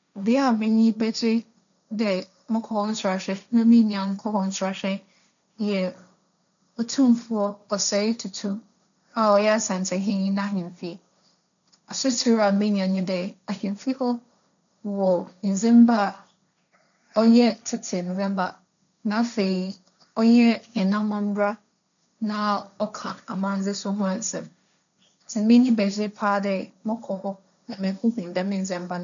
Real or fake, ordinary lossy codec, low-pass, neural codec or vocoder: fake; none; 7.2 kHz; codec, 16 kHz, 1.1 kbps, Voila-Tokenizer